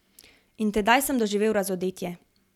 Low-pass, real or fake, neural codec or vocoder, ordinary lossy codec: 19.8 kHz; real; none; none